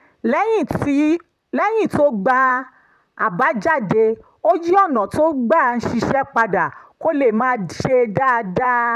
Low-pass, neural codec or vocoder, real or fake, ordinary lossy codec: 14.4 kHz; vocoder, 44.1 kHz, 128 mel bands, Pupu-Vocoder; fake; none